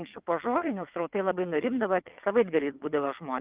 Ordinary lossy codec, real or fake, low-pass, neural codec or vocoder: Opus, 32 kbps; fake; 3.6 kHz; vocoder, 22.05 kHz, 80 mel bands, WaveNeXt